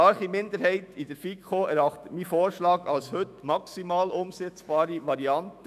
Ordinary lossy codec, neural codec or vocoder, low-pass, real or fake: none; autoencoder, 48 kHz, 128 numbers a frame, DAC-VAE, trained on Japanese speech; 14.4 kHz; fake